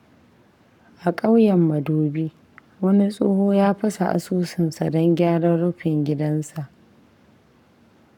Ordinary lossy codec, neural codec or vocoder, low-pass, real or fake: none; codec, 44.1 kHz, 7.8 kbps, Pupu-Codec; 19.8 kHz; fake